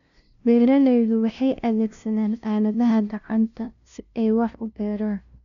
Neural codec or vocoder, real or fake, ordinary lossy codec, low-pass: codec, 16 kHz, 0.5 kbps, FunCodec, trained on LibriTTS, 25 frames a second; fake; MP3, 64 kbps; 7.2 kHz